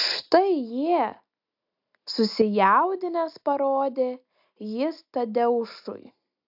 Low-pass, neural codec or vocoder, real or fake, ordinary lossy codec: 5.4 kHz; none; real; AAC, 48 kbps